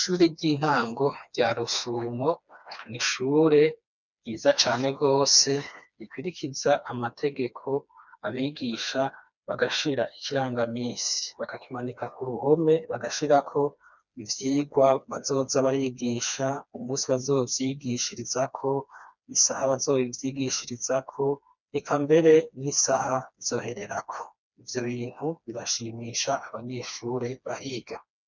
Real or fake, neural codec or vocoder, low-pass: fake; codec, 16 kHz, 2 kbps, FreqCodec, smaller model; 7.2 kHz